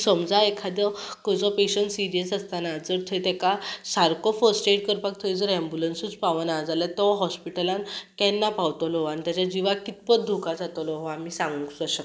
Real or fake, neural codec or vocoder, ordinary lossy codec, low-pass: real; none; none; none